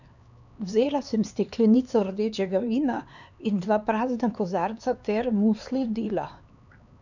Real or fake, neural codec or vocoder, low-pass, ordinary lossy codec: fake; codec, 16 kHz, 4 kbps, X-Codec, HuBERT features, trained on LibriSpeech; 7.2 kHz; none